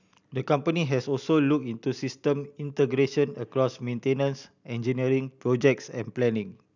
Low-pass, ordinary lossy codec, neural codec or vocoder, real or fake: 7.2 kHz; none; none; real